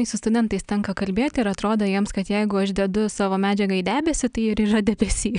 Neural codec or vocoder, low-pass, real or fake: none; 9.9 kHz; real